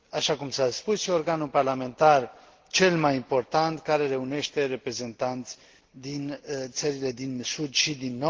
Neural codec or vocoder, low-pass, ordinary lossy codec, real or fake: none; 7.2 kHz; Opus, 16 kbps; real